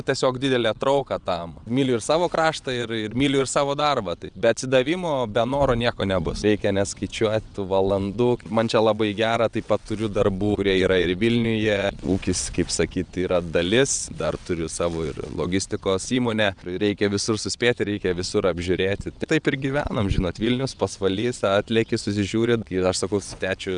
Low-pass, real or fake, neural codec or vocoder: 9.9 kHz; fake; vocoder, 22.05 kHz, 80 mel bands, WaveNeXt